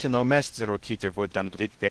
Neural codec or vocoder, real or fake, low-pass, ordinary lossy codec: codec, 16 kHz in and 24 kHz out, 0.6 kbps, FocalCodec, streaming, 2048 codes; fake; 10.8 kHz; Opus, 16 kbps